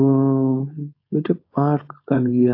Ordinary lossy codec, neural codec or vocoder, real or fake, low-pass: AAC, 32 kbps; codec, 16 kHz, 4.8 kbps, FACodec; fake; 5.4 kHz